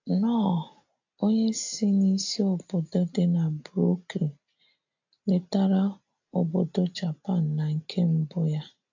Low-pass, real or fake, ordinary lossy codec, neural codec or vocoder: 7.2 kHz; real; AAC, 48 kbps; none